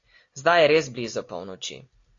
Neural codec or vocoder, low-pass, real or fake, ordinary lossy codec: none; 7.2 kHz; real; AAC, 48 kbps